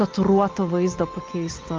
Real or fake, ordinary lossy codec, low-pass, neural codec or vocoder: real; Opus, 32 kbps; 7.2 kHz; none